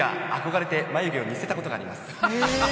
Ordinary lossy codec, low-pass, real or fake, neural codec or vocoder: none; none; real; none